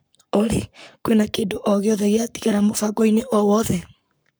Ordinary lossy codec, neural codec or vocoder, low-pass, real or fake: none; codec, 44.1 kHz, 7.8 kbps, Pupu-Codec; none; fake